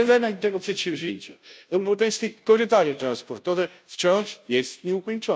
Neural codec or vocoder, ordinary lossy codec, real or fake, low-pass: codec, 16 kHz, 0.5 kbps, FunCodec, trained on Chinese and English, 25 frames a second; none; fake; none